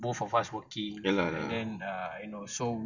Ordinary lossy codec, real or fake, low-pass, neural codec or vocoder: none; real; 7.2 kHz; none